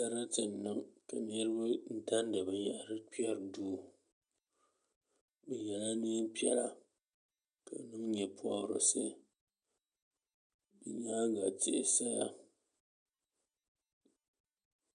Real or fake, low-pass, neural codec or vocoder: real; 9.9 kHz; none